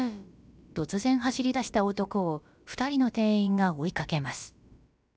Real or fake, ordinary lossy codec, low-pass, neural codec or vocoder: fake; none; none; codec, 16 kHz, about 1 kbps, DyCAST, with the encoder's durations